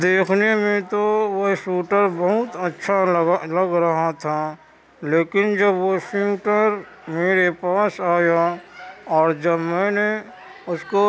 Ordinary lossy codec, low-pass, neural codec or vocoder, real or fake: none; none; none; real